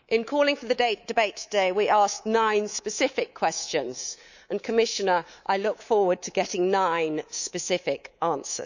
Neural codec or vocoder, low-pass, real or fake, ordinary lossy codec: codec, 24 kHz, 3.1 kbps, DualCodec; 7.2 kHz; fake; none